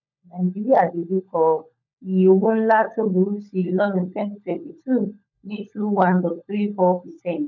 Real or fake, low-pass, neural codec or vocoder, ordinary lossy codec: fake; 7.2 kHz; codec, 16 kHz, 16 kbps, FunCodec, trained on LibriTTS, 50 frames a second; none